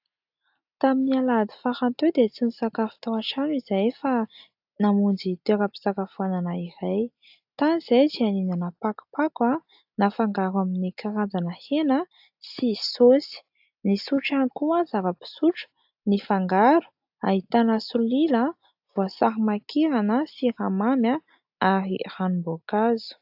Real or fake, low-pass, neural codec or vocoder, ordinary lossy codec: real; 5.4 kHz; none; AAC, 48 kbps